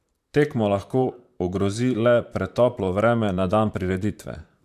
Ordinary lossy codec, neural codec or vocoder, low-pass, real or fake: MP3, 96 kbps; vocoder, 44.1 kHz, 128 mel bands, Pupu-Vocoder; 14.4 kHz; fake